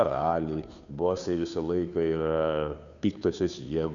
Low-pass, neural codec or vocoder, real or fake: 7.2 kHz; codec, 16 kHz, 2 kbps, FunCodec, trained on Chinese and English, 25 frames a second; fake